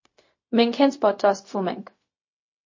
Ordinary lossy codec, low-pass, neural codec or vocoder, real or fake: MP3, 32 kbps; 7.2 kHz; codec, 16 kHz, 0.4 kbps, LongCat-Audio-Codec; fake